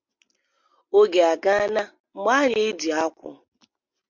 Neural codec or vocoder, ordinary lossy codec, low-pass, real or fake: none; MP3, 48 kbps; 7.2 kHz; real